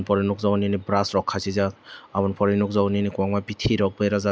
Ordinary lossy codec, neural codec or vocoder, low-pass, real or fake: none; none; none; real